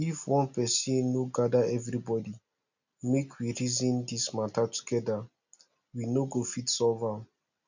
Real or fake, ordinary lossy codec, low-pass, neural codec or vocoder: real; none; 7.2 kHz; none